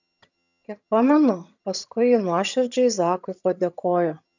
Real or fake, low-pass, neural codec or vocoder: fake; 7.2 kHz; vocoder, 22.05 kHz, 80 mel bands, HiFi-GAN